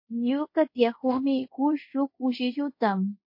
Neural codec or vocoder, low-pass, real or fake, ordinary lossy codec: codec, 24 kHz, 0.5 kbps, DualCodec; 5.4 kHz; fake; MP3, 32 kbps